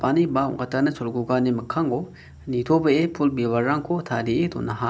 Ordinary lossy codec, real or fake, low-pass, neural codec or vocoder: none; real; none; none